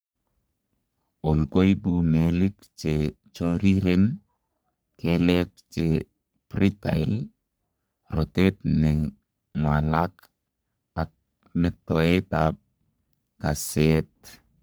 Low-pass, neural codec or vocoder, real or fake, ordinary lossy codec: none; codec, 44.1 kHz, 3.4 kbps, Pupu-Codec; fake; none